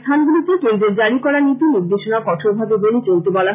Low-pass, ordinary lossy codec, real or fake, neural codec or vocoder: 3.6 kHz; none; real; none